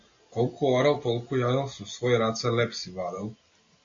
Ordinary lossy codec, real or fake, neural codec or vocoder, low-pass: AAC, 48 kbps; real; none; 7.2 kHz